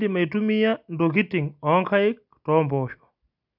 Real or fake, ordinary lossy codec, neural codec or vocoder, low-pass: real; none; none; 5.4 kHz